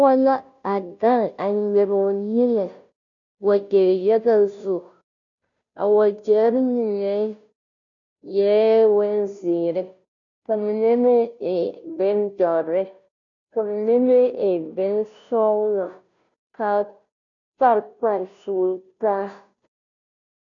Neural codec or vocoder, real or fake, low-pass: codec, 16 kHz, 0.5 kbps, FunCodec, trained on Chinese and English, 25 frames a second; fake; 7.2 kHz